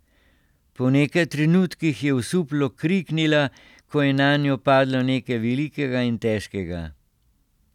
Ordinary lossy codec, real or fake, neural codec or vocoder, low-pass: none; real; none; 19.8 kHz